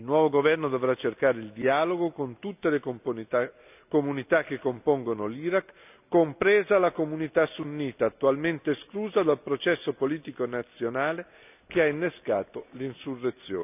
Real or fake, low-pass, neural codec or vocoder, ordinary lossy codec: real; 3.6 kHz; none; none